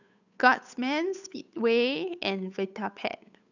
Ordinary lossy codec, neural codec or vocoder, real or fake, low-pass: none; codec, 16 kHz, 8 kbps, FunCodec, trained on Chinese and English, 25 frames a second; fake; 7.2 kHz